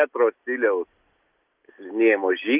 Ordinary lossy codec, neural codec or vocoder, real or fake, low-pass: Opus, 32 kbps; none; real; 3.6 kHz